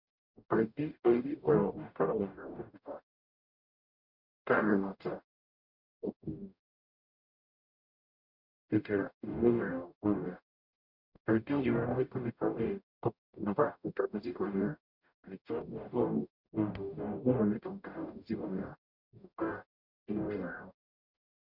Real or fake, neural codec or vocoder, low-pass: fake; codec, 44.1 kHz, 0.9 kbps, DAC; 5.4 kHz